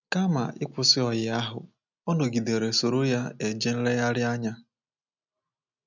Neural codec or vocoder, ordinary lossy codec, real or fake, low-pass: none; none; real; 7.2 kHz